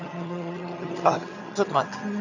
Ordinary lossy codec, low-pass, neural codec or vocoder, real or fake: none; 7.2 kHz; vocoder, 22.05 kHz, 80 mel bands, HiFi-GAN; fake